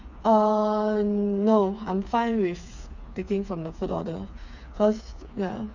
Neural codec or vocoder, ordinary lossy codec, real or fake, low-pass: codec, 16 kHz, 4 kbps, FreqCodec, smaller model; none; fake; 7.2 kHz